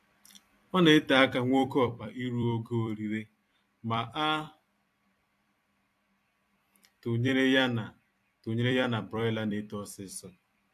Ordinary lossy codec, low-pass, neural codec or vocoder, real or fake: AAC, 64 kbps; 14.4 kHz; vocoder, 44.1 kHz, 128 mel bands every 256 samples, BigVGAN v2; fake